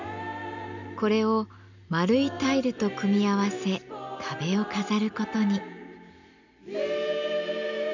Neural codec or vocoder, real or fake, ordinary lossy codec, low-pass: none; real; none; 7.2 kHz